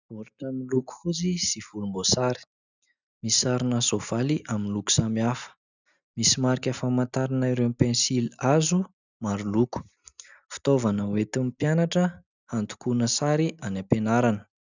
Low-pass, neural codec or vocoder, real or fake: 7.2 kHz; none; real